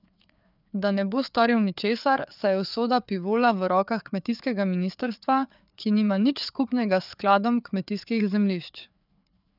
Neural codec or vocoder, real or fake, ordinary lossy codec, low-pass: codec, 16 kHz, 4 kbps, FreqCodec, larger model; fake; none; 5.4 kHz